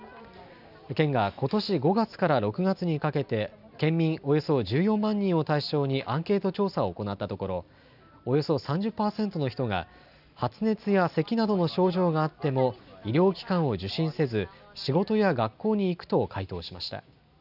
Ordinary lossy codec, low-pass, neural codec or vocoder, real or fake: none; 5.4 kHz; none; real